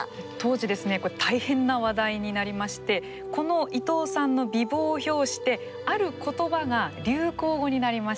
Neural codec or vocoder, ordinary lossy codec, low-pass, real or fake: none; none; none; real